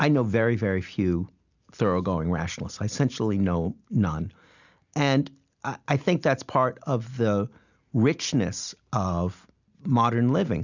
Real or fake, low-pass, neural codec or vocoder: real; 7.2 kHz; none